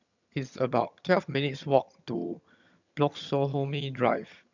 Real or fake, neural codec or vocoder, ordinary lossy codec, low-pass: fake; vocoder, 22.05 kHz, 80 mel bands, HiFi-GAN; none; 7.2 kHz